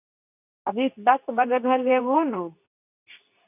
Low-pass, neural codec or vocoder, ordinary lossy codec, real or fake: 3.6 kHz; codec, 24 kHz, 0.9 kbps, WavTokenizer, medium speech release version 2; none; fake